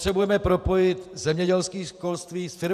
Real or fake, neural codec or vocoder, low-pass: fake; vocoder, 48 kHz, 128 mel bands, Vocos; 14.4 kHz